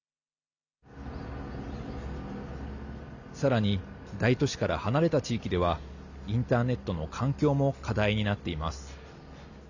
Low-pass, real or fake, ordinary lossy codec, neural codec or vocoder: 7.2 kHz; real; none; none